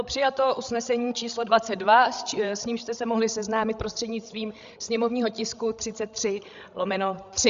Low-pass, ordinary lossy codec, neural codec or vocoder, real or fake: 7.2 kHz; Opus, 64 kbps; codec, 16 kHz, 16 kbps, FreqCodec, larger model; fake